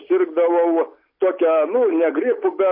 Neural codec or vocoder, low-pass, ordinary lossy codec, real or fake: none; 5.4 kHz; MP3, 32 kbps; real